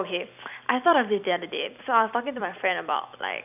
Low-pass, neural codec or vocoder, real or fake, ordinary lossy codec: 3.6 kHz; vocoder, 44.1 kHz, 128 mel bands every 256 samples, BigVGAN v2; fake; none